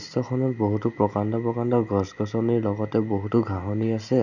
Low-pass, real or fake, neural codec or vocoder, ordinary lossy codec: 7.2 kHz; real; none; none